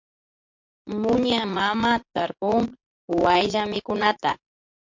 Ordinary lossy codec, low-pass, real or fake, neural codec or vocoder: MP3, 48 kbps; 7.2 kHz; fake; vocoder, 44.1 kHz, 80 mel bands, Vocos